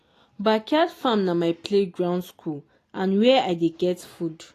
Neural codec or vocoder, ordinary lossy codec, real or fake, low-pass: none; AAC, 48 kbps; real; 14.4 kHz